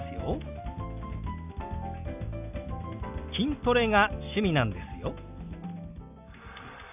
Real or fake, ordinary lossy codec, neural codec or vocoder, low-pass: real; none; none; 3.6 kHz